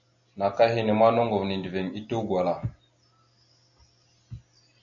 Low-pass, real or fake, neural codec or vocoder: 7.2 kHz; real; none